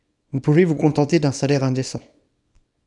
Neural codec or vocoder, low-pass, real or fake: codec, 24 kHz, 0.9 kbps, WavTokenizer, small release; 10.8 kHz; fake